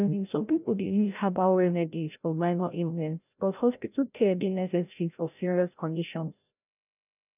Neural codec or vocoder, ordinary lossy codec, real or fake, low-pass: codec, 16 kHz, 0.5 kbps, FreqCodec, larger model; none; fake; 3.6 kHz